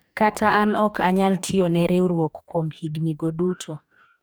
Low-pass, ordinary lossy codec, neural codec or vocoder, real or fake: none; none; codec, 44.1 kHz, 2.6 kbps, DAC; fake